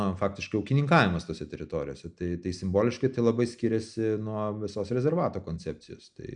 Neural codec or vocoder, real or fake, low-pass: none; real; 9.9 kHz